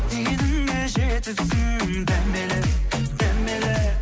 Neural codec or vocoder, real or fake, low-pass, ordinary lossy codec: none; real; none; none